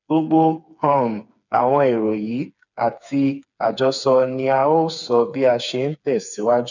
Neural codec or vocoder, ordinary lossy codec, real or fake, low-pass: codec, 16 kHz, 4 kbps, FreqCodec, smaller model; none; fake; 7.2 kHz